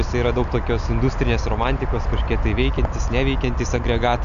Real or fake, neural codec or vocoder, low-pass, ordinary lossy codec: real; none; 7.2 kHz; MP3, 96 kbps